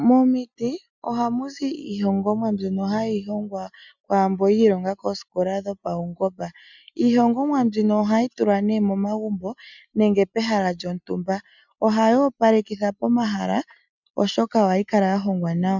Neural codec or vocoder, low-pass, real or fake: none; 7.2 kHz; real